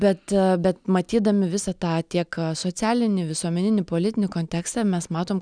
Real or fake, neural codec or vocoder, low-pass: real; none; 9.9 kHz